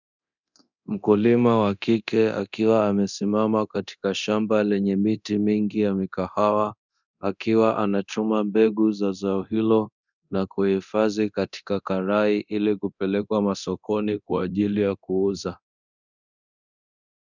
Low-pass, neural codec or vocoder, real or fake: 7.2 kHz; codec, 24 kHz, 0.9 kbps, DualCodec; fake